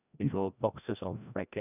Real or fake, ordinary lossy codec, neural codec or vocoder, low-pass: fake; none; codec, 16 kHz, 1 kbps, FreqCodec, larger model; 3.6 kHz